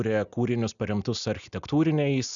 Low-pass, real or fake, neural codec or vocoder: 7.2 kHz; real; none